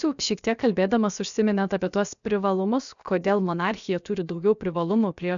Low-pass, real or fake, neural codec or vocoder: 7.2 kHz; fake; codec, 16 kHz, about 1 kbps, DyCAST, with the encoder's durations